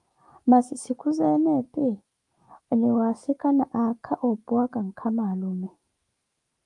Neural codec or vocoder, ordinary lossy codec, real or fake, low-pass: autoencoder, 48 kHz, 128 numbers a frame, DAC-VAE, trained on Japanese speech; Opus, 32 kbps; fake; 10.8 kHz